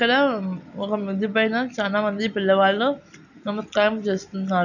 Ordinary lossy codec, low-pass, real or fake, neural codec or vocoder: none; 7.2 kHz; real; none